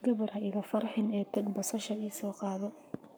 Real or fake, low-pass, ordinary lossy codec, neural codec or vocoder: fake; none; none; codec, 44.1 kHz, 7.8 kbps, Pupu-Codec